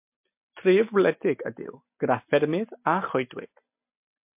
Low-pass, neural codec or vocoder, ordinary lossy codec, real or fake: 3.6 kHz; none; MP3, 32 kbps; real